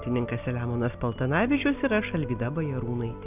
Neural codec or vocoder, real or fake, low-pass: none; real; 3.6 kHz